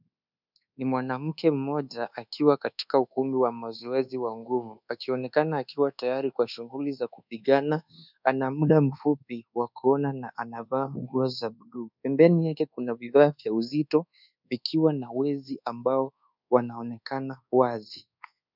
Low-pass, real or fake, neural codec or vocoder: 5.4 kHz; fake; codec, 24 kHz, 1.2 kbps, DualCodec